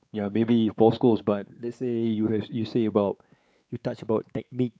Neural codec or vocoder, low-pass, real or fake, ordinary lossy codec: codec, 16 kHz, 4 kbps, X-Codec, WavLM features, trained on Multilingual LibriSpeech; none; fake; none